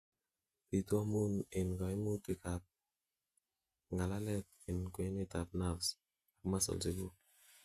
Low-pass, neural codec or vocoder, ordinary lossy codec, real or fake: 14.4 kHz; vocoder, 48 kHz, 128 mel bands, Vocos; Opus, 64 kbps; fake